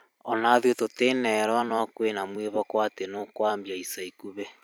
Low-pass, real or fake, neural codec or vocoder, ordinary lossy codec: none; fake; vocoder, 44.1 kHz, 128 mel bands every 256 samples, BigVGAN v2; none